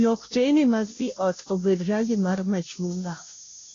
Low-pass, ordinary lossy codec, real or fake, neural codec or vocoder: 7.2 kHz; AAC, 32 kbps; fake; codec, 16 kHz, 0.5 kbps, FunCodec, trained on Chinese and English, 25 frames a second